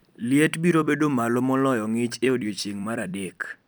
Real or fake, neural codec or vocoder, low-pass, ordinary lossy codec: fake; vocoder, 44.1 kHz, 128 mel bands every 256 samples, BigVGAN v2; none; none